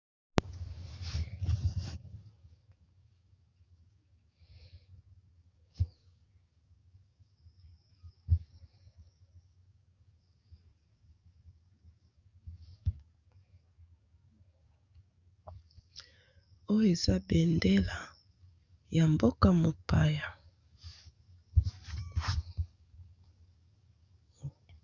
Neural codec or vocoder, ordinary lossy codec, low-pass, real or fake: codec, 44.1 kHz, 7.8 kbps, DAC; Opus, 64 kbps; 7.2 kHz; fake